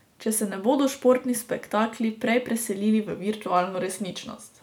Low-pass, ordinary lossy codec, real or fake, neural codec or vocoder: 19.8 kHz; none; real; none